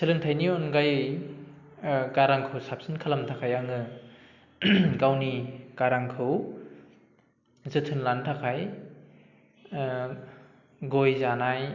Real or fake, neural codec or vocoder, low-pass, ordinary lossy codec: real; none; 7.2 kHz; none